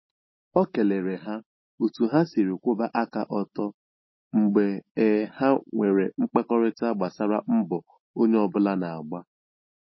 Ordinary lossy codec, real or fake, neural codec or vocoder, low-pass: MP3, 24 kbps; real; none; 7.2 kHz